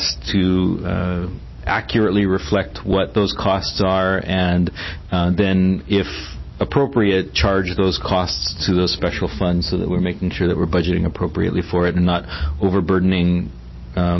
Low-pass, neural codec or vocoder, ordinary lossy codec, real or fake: 7.2 kHz; none; MP3, 24 kbps; real